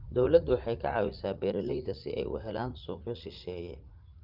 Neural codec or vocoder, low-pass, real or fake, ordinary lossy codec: vocoder, 44.1 kHz, 80 mel bands, Vocos; 5.4 kHz; fake; Opus, 32 kbps